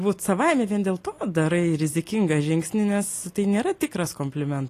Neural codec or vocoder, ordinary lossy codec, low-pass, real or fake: none; AAC, 48 kbps; 14.4 kHz; real